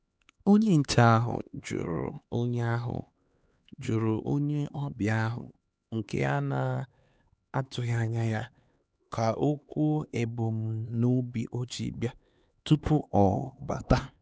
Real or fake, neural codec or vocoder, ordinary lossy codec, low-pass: fake; codec, 16 kHz, 4 kbps, X-Codec, HuBERT features, trained on LibriSpeech; none; none